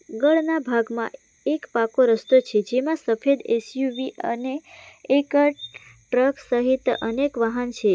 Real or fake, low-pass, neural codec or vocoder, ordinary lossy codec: real; none; none; none